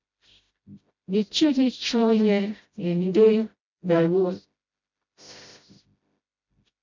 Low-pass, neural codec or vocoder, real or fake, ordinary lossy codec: 7.2 kHz; codec, 16 kHz, 0.5 kbps, FreqCodec, smaller model; fake; MP3, 48 kbps